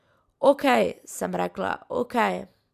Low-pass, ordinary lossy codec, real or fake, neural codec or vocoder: 14.4 kHz; none; real; none